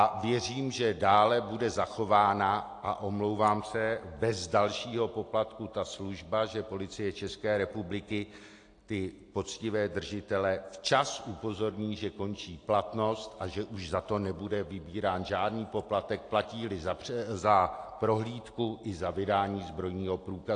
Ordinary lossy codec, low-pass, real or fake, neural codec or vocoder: AAC, 48 kbps; 9.9 kHz; real; none